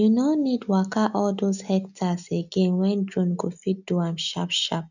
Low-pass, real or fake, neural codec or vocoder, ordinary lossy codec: 7.2 kHz; real; none; none